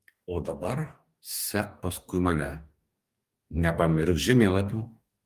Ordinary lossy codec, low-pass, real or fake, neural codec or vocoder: Opus, 32 kbps; 14.4 kHz; fake; codec, 44.1 kHz, 2.6 kbps, DAC